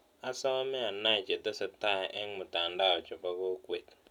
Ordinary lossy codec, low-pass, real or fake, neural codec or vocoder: none; 19.8 kHz; real; none